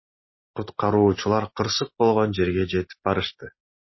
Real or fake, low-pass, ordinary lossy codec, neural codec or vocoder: real; 7.2 kHz; MP3, 24 kbps; none